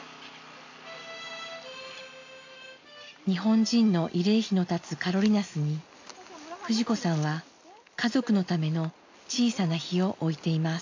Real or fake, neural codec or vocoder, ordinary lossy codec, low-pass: real; none; AAC, 48 kbps; 7.2 kHz